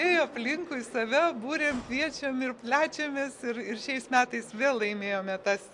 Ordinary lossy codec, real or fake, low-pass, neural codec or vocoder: MP3, 64 kbps; real; 10.8 kHz; none